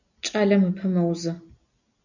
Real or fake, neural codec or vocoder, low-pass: real; none; 7.2 kHz